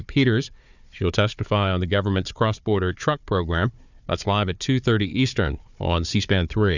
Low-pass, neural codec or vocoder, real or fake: 7.2 kHz; codec, 16 kHz, 4 kbps, FunCodec, trained on Chinese and English, 50 frames a second; fake